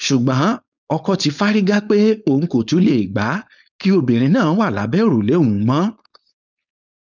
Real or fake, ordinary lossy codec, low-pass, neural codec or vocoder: fake; none; 7.2 kHz; codec, 16 kHz, 4.8 kbps, FACodec